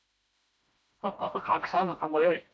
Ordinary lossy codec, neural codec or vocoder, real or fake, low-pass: none; codec, 16 kHz, 1 kbps, FreqCodec, smaller model; fake; none